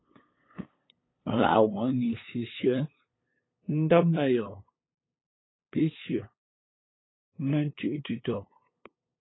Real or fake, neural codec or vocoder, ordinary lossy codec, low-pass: fake; codec, 16 kHz, 8 kbps, FunCodec, trained on LibriTTS, 25 frames a second; AAC, 16 kbps; 7.2 kHz